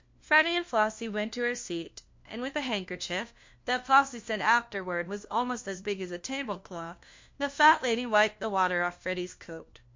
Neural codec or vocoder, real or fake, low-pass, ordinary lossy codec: codec, 16 kHz, 1 kbps, FunCodec, trained on LibriTTS, 50 frames a second; fake; 7.2 kHz; MP3, 48 kbps